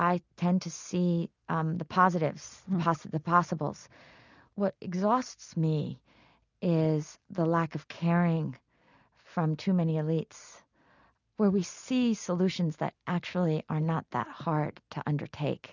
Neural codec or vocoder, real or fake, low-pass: none; real; 7.2 kHz